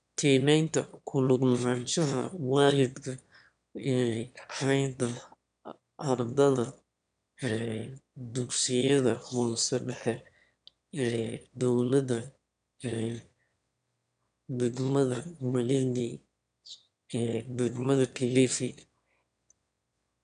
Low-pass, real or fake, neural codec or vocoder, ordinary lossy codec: 9.9 kHz; fake; autoencoder, 22.05 kHz, a latent of 192 numbers a frame, VITS, trained on one speaker; none